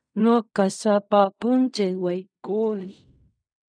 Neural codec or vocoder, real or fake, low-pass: codec, 16 kHz in and 24 kHz out, 0.4 kbps, LongCat-Audio-Codec, fine tuned four codebook decoder; fake; 9.9 kHz